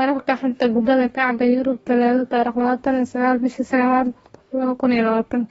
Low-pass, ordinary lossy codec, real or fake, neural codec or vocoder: 19.8 kHz; AAC, 24 kbps; fake; codec, 44.1 kHz, 2.6 kbps, DAC